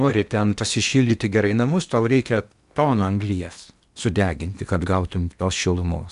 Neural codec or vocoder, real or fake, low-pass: codec, 16 kHz in and 24 kHz out, 0.8 kbps, FocalCodec, streaming, 65536 codes; fake; 10.8 kHz